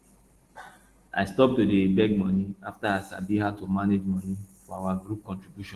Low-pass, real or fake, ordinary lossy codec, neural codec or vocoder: 14.4 kHz; real; Opus, 16 kbps; none